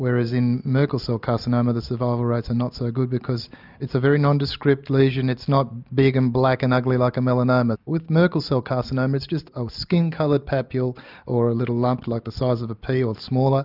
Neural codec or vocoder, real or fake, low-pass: none; real; 5.4 kHz